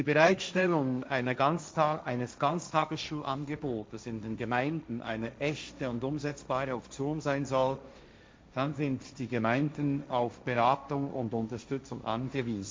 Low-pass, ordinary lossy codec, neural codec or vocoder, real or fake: none; none; codec, 16 kHz, 1.1 kbps, Voila-Tokenizer; fake